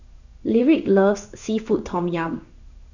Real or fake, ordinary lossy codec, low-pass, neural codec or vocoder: fake; none; 7.2 kHz; codec, 16 kHz in and 24 kHz out, 1 kbps, XY-Tokenizer